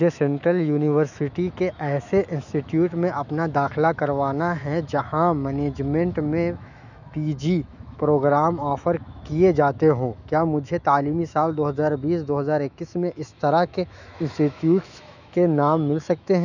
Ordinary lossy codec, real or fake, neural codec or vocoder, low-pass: none; fake; autoencoder, 48 kHz, 128 numbers a frame, DAC-VAE, trained on Japanese speech; 7.2 kHz